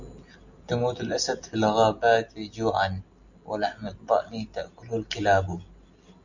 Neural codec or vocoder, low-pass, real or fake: none; 7.2 kHz; real